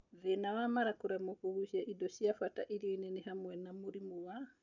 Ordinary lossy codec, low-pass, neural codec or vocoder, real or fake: none; 7.2 kHz; none; real